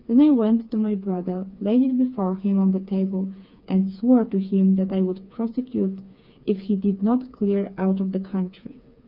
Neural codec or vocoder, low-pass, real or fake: codec, 16 kHz, 4 kbps, FreqCodec, smaller model; 5.4 kHz; fake